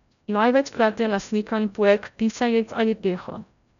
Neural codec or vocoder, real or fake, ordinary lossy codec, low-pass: codec, 16 kHz, 0.5 kbps, FreqCodec, larger model; fake; none; 7.2 kHz